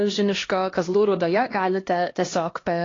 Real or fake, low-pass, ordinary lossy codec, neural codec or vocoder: fake; 7.2 kHz; AAC, 32 kbps; codec, 16 kHz, 1 kbps, X-Codec, HuBERT features, trained on LibriSpeech